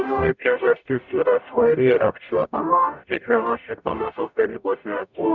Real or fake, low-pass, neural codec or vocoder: fake; 7.2 kHz; codec, 44.1 kHz, 0.9 kbps, DAC